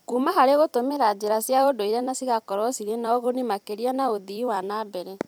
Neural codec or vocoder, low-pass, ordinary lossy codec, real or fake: vocoder, 44.1 kHz, 128 mel bands every 256 samples, BigVGAN v2; none; none; fake